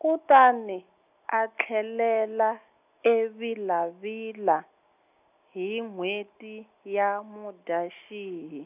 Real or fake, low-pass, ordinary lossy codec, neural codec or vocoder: real; 3.6 kHz; none; none